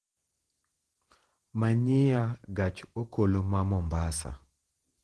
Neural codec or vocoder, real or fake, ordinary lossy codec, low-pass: vocoder, 48 kHz, 128 mel bands, Vocos; fake; Opus, 16 kbps; 10.8 kHz